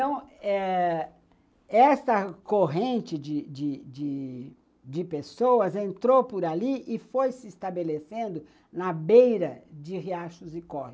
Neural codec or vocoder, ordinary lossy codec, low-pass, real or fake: none; none; none; real